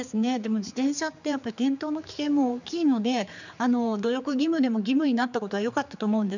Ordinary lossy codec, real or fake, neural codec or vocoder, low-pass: none; fake; codec, 16 kHz, 4 kbps, X-Codec, HuBERT features, trained on general audio; 7.2 kHz